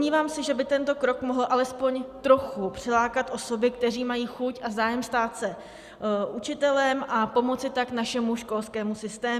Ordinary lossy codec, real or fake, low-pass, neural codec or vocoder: Opus, 64 kbps; real; 14.4 kHz; none